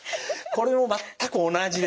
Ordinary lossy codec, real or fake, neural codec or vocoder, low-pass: none; real; none; none